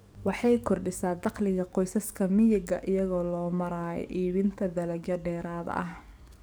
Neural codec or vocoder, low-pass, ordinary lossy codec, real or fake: codec, 44.1 kHz, 7.8 kbps, DAC; none; none; fake